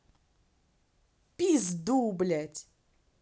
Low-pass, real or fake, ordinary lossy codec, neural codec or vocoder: none; real; none; none